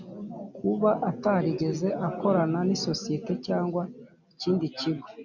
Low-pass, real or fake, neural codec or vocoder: 7.2 kHz; real; none